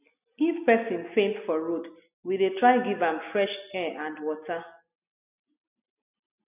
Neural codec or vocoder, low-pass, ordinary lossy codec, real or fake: none; 3.6 kHz; none; real